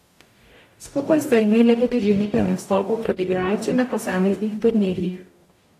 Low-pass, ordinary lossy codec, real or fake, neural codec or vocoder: 14.4 kHz; AAC, 64 kbps; fake; codec, 44.1 kHz, 0.9 kbps, DAC